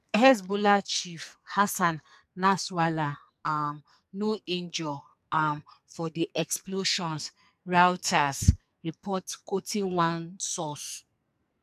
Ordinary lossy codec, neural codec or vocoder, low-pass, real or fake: MP3, 96 kbps; codec, 44.1 kHz, 2.6 kbps, SNAC; 14.4 kHz; fake